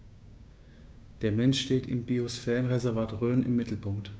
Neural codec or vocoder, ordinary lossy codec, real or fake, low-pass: codec, 16 kHz, 6 kbps, DAC; none; fake; none